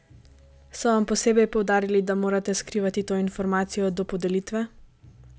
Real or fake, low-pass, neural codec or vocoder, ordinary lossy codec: real; none; none; none